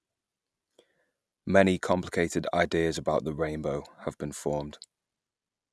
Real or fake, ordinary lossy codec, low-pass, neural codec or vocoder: real; none; none; none